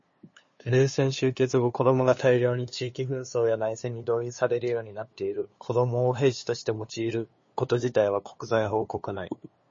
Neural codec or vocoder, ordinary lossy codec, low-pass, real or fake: codec, 16 kHz, 2 kbps, FunCodec, trained on LibriTTS, 25 frames a second; MP3, 32 kbps; 7.2 kHz; fake